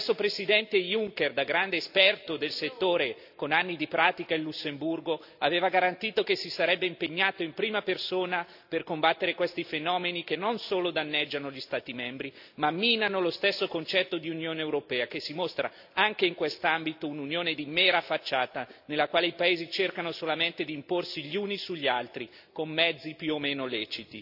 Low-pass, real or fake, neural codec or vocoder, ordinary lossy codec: 5.4 kHz; real; none; AAC, 48 kbps